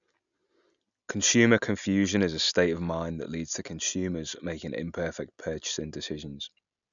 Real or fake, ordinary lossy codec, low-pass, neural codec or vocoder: real; none; 7.2 kHz; none